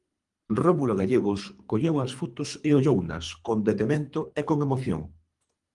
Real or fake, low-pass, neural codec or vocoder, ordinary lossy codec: fake; 10.8 kHz; codec, 24 kHz, 3 kbps, HILCodec; Opus, 32 kbps